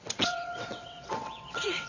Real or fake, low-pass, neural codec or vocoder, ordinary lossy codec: fake; 7.2 kHz; vocoder, 22.05 kHz, 80 mel bands, WaveNeXt; none